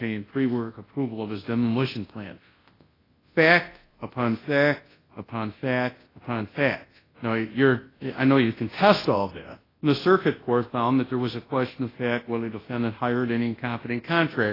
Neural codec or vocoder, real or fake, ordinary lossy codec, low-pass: codec, 24 kHz, 0.9 kbps, WavTokenizer, large speech release; fake; AAC, 24 kbps; 5.4 kHz